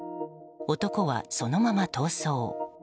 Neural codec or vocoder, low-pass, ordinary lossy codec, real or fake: none; none; none; real